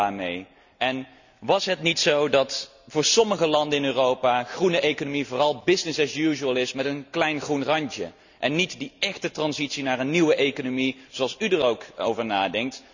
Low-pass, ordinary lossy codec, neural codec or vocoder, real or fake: 7.2 kHz; none; none; real